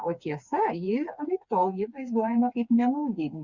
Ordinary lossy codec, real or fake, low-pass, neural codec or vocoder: Opus, 64 kbps; fake; 7.2 kHz; codec, 16 kHz, 4 kbps, FreqCodec, smaller model